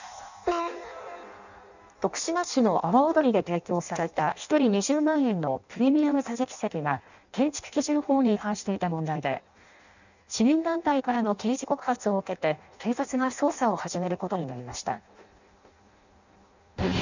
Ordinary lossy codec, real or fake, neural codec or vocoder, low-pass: none; fake; codec, 16 kHz in and 24 kHz out, 0.6 kbps, FireRedTTS-2 codec; 7.2 kHz